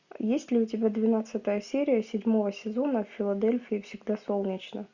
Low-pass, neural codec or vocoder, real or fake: 7.2 kHz; none; real